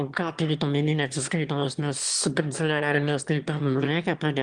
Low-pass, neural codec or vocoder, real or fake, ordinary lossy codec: 9.9 kHz; autoencoder, 22.05 kHz, a latent of 192 numbers a frame, VITS, trained on one speaker; fake; Opus, 24 kbps